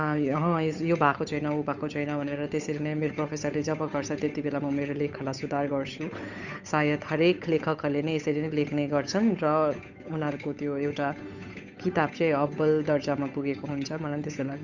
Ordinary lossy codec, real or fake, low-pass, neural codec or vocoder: none; fake; 7.2 kHz; codec, 16 kHz, 8 kbps, FunCodec, trained on Chinese and English, 25 frames a second